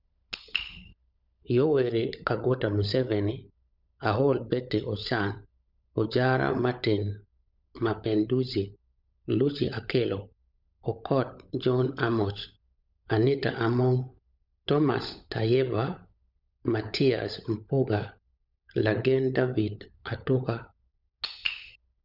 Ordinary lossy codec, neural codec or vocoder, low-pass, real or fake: none; codec, 16 kHz, 16 kbps, FunCodec, trained on LibriTTS, 50 frames a second; 5.4 kHz; fake